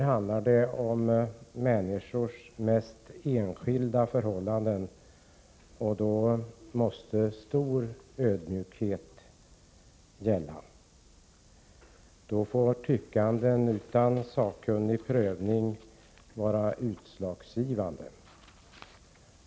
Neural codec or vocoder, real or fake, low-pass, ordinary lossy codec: none; real; none; none